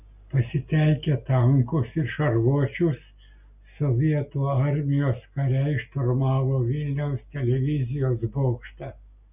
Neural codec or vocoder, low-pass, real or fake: none; 3.6 kHz; real